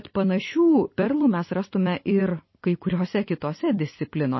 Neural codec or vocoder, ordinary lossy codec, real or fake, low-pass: vocoder, 44.1 kHz, 128 mel bands every 256 samples, BigVGAN v2; MP3, 24 kbps; fake; 7.2 kHz